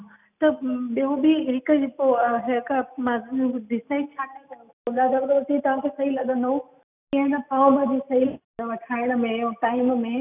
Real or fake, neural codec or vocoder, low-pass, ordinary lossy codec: real; none; 3.6 kHz; none